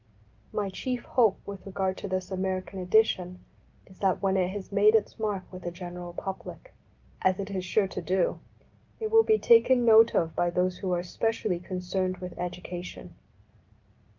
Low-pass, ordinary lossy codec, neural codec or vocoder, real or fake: 7.2 kHz; Opus, 24 kbps; none; real